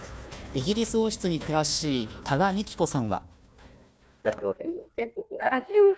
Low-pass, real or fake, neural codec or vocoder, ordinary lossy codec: none; fake; codec, 16 kHz, 1 kbps, FunCodec, trained on Chinese and English, 50 frames a second; none